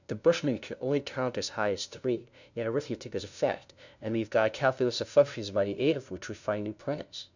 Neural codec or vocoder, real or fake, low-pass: codec, 16 kHz, 0.5 kbps, FunCodec, trained on LibriTTS, 25 frames a second; fake; 7.2 kHz